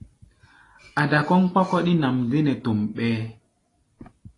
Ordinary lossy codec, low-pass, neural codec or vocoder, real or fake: AAC, 32 kbps; 10.8 kHz; none; real